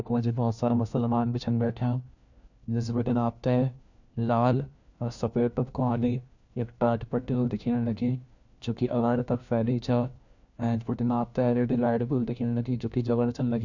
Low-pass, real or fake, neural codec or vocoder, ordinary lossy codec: 7.2 kHz; fake; codec, 16 kHz, 1 kbps, FunCodec, trained on LibriTTS, 50 frames a second; none